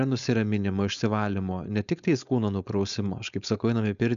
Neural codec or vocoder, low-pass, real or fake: codec, 16 kHz, 4.8 kbps, FACodec; 7.2 kHz; fake